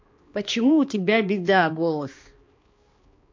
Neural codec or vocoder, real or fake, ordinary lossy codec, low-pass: codec, 16 kHz, 2 kbps, X-Codec, HuBERT features, trained on balanced general audio; fake; MP3, 48 kbps; 7.2 kHz